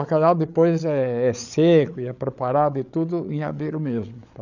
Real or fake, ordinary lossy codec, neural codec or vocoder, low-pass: fake; none; codec, 16 kHz, 8 kbps, FreqCodec, larger model; 7.2 kHz